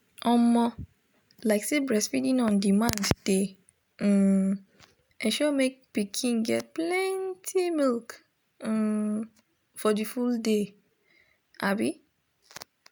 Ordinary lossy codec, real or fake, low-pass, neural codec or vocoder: none; real; none; none